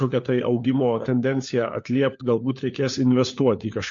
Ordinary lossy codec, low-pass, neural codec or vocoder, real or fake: MP3, 48 kbps; 7.2 kHz; codec, 16 kHz, 4 kbps, FunCodec, trained on LibriTTS, 50 frames a second; fake